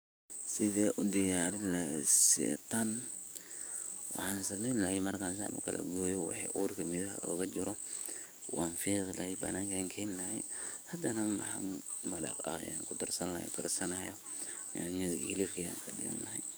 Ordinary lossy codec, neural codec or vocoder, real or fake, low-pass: none; codec, 44.1 kHz, 7.8 kbps, DAC; fake; none